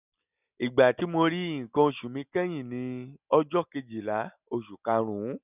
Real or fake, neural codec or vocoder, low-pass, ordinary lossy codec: real; none; 3.6 kHz; none